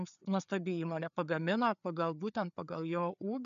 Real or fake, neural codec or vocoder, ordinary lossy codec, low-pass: fake; codec, 16 kHz, 4 kbps, FreqCodec, larger model; MP3, 96 kbps; 7.2 kHz